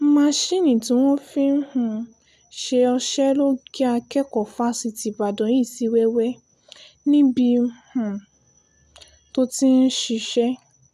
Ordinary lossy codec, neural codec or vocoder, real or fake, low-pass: none; none; real; 14.4 kHz